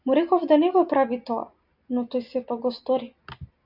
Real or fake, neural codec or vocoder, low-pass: real; none; 5.4 kHz